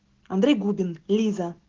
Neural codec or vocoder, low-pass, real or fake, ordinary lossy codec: none; 7.2 kHz; real; Opus, 16 kbps